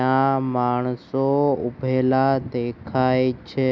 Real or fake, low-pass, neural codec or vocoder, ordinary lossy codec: real; none; none; none